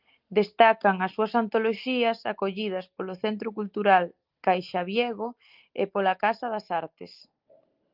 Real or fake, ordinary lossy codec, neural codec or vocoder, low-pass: real; Opus, 24 kbps; none; 5.4 kHz